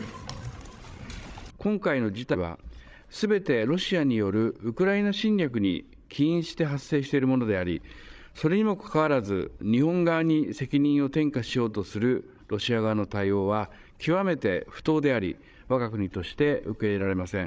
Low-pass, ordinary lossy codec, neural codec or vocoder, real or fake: none; none; codec, 16 kHz, 16 kbps, FreqCodec, larger model; fake